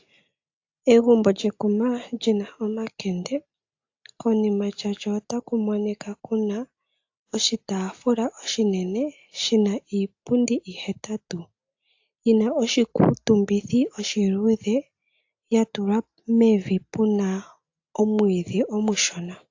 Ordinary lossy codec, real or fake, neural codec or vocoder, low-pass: AAC, 48 kbps; real; none; 7.2 kHz